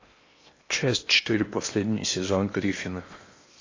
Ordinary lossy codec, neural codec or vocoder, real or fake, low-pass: AAC, 32 kbps; codec, 16 kHz in and 24 kHz out, 0.8 kbps, FocalCodec, streaming, 65536 codes; fake; 7.2 kHz